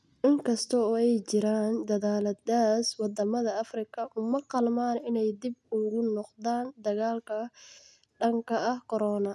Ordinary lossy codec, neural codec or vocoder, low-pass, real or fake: none; none; none; real